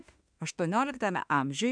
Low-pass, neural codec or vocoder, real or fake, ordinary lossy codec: 9.9 kHz; autoencoder, 48 kHz, 32 numbers a frame, DAC-VAE, trained on Japanese speech; fake; MP3, 96 kbps